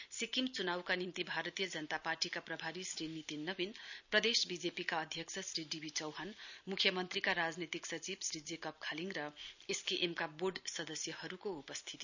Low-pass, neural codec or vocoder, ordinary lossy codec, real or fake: 7.2 kHz; none; none; real